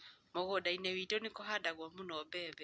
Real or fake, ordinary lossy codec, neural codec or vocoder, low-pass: real; none; none; none